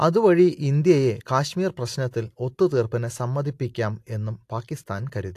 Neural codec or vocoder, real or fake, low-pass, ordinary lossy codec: none; real; 14.4 kHz; AAC, 64 kbps